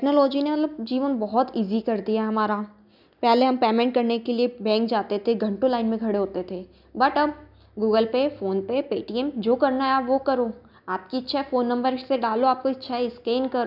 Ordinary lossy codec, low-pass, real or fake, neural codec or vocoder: none; 5.4 kHz; real; none